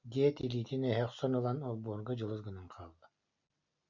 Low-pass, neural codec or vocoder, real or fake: 7.2 kHz; none; real